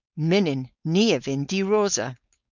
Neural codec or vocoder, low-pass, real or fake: codec, 16 kHz, 4.8 kbps, FACodec; 7.2 kHz; fake